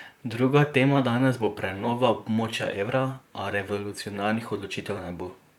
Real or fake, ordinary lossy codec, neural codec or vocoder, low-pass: fake; none; vocoder, 44.1 kHz, 128 mel bands, Pupu-Vocoder; 19.8 kHz